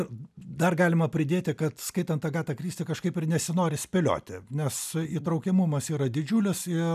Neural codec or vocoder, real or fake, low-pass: none; real; 14.4 kHz